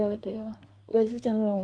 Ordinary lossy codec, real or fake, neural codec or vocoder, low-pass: none; fake; codec, 24 kHz, 1 kbps, SNAC; 9.9 kHz